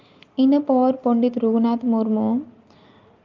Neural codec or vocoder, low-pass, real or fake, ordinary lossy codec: none; 7.2 kHz; real; Opus, 32 kbps